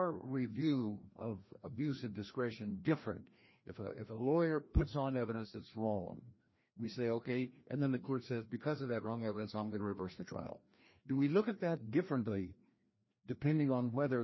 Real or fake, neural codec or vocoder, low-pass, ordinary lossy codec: fake; codec, 16 kHz, 1 kbps, FreqCodec, larger model; 7.2 kHz; MP3, 24 kbps